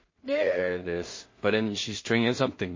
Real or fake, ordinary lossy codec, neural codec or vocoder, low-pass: fake; MP3, 32 kbps; codec, 16 kHz in and 24 kHz out, 0.4 kbps, LongCat-Audio-Codec, two codebook decoder; 7.2 kHz